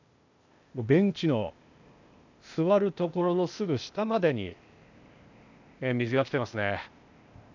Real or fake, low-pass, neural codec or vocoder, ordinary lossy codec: fake; 7.2 kHz; codec, 16 kHz, 0.8 kbps, ZipCodec; none